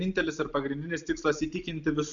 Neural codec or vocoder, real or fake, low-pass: none; real; 7.2 kHz